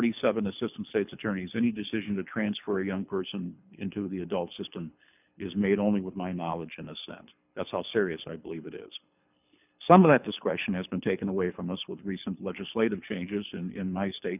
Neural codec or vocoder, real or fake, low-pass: codec, 24 kHz, 6 kbps, HILCodec; fake; 3.6 kHz